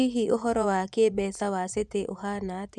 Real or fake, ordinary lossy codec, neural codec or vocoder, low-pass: fake; none; vocoder, 24 kHz, 100 mel bands, Vocos; none